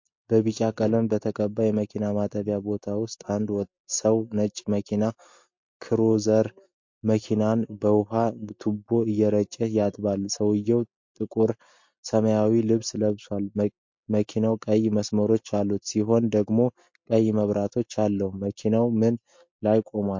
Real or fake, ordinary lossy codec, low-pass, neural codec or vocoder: real; MP3, 48 kbps; 7.2 kHz; none